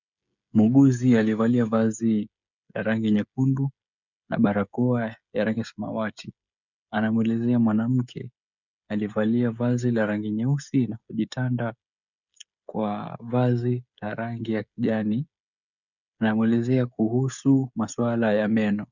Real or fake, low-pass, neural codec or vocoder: fake; 7.2 kHz; codec, 16 kHz, 16 kbps, FreqCodec, smaller model